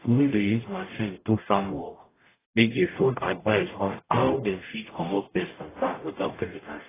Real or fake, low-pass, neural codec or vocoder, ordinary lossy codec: fake; 3.6 kHz; codec, 44.1 kHz, 0.9 kbps, DAC; AAC, 16 kbps